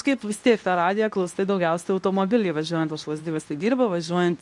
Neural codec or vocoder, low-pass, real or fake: codec, 24 kHz, 0.9 kbps, WavTokenizer, medium speech release version 2; 10.8 kHz; fake